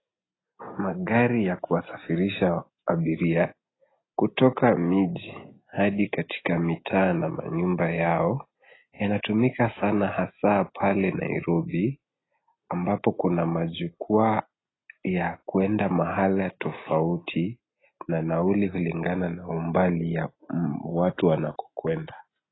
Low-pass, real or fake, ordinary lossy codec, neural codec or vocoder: 7.2 kHz; real; AAC, 16 kbps; none